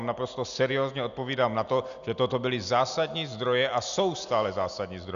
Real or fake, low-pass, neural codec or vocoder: real; 7.2 kHz; none